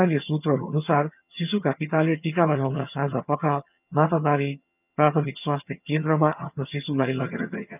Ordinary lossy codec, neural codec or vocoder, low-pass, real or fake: none; vocoder, 22.05 kHz, 80 mel bands, HiFi-GAN; 3.6 kHz; fake